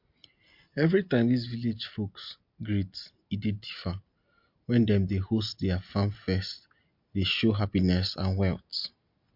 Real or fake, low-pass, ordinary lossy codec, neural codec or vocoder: real; 5.4 kHz; MP3, 48 kbps; none